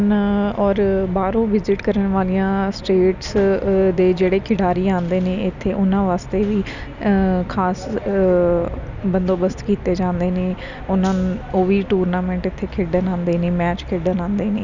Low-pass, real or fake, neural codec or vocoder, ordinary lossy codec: 7.2 kHz; real; none; none